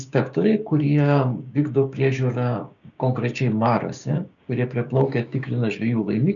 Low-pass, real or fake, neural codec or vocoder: 7.2 kHz; fake; codec, 16 kHz, 6 kbps, DAC